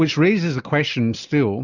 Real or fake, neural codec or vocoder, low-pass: real; none; 7.2 kHz